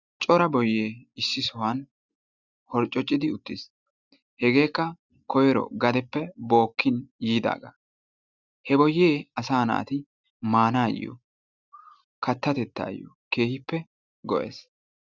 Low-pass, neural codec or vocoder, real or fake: 7.2 kHz; none; real